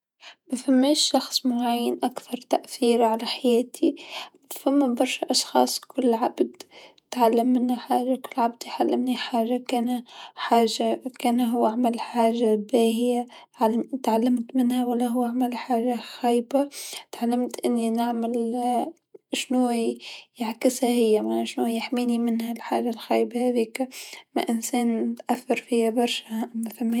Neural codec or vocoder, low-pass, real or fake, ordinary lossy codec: vocoder, 48 kHz, 128 mel bands, Vocos; 19.8 kHz; fake; none